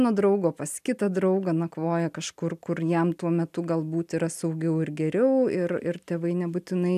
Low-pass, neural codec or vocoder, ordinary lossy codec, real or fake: 14.4 kHz; none; AAC, 96 kbps; real